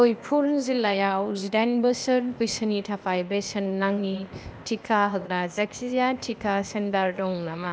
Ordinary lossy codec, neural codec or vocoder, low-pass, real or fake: none; codec, 16 kHz, 0.8 kbps, ZipCodec; none; fake